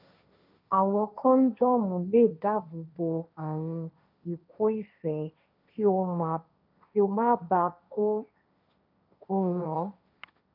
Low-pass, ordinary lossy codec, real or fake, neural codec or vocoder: 5.4 kHz; none; fake; codec, 16 kHz, 1.1 kbps, Voila-Tokenizer